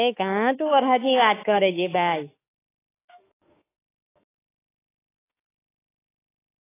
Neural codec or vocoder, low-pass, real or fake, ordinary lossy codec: autoencoder, 48 kHz, 32 numbers a frame, DAC-VAE, trained on Japanese speech; 3.6 kHz; fake; AAC, 16 kbps